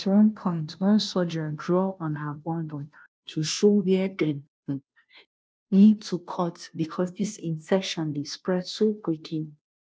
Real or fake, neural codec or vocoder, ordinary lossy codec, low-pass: fake; codec, 16 kHz, 0.5 kbps, FunCodec, trained on Chinese and English, 25 frames a second; none; none